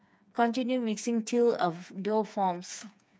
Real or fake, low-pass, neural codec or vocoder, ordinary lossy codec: fake; none; codec, 16 kHz, 4 kbps, FreqCodec, smaller model; none